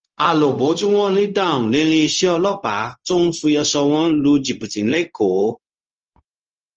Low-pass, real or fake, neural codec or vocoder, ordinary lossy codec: 7.2 kHz; fake; codec, 16 kHz, 0.4 kbps, LongCat-Audio-Codec; Opus, 24 kbps